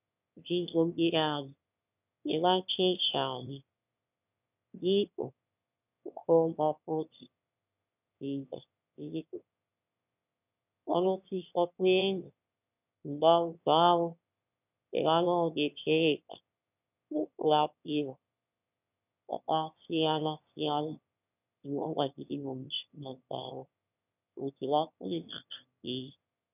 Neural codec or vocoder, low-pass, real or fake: autoencoder, 22.05 kHz, a latent of 192 numbers a frame, VITS, trained on one speaker; 3.6 kHz; fake